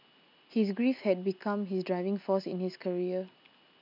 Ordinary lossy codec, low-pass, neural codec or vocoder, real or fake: none; 5.4 kHz; none; real